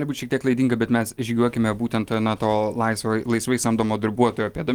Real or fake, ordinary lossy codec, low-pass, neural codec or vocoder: real; Opus, 24 kbps; 19.8 kHz; none